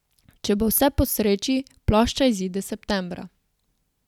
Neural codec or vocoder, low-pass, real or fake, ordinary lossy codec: none; 19.8 kHz; real; none